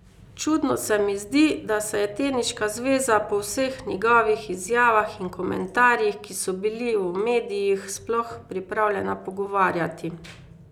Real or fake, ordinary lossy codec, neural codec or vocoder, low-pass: real; none; none; 19.8 kHz